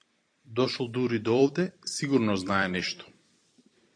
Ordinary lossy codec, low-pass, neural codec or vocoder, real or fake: AAC, 48 kbps; 9.9 kHz; vocoder, 44.1 kHz, 128 mel bands every 256 samples, BigVGAN v2; fake